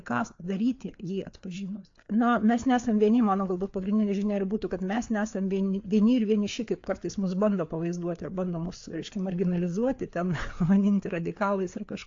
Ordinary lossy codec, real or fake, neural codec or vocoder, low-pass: AAC, 48 kbps; fake; codec, 16 kHz, 4 kbps, FreqCodec, larger model; 7.2 kHz